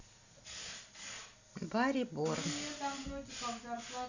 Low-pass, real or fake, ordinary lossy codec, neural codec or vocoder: 7.2 kHz; real; none; none